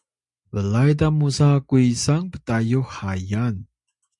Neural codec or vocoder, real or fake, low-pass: none; real; 10.8 kHz